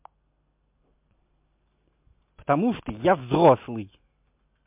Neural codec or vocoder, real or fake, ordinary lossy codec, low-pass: none; real; MP3, 32 kbps; 3.6 kHz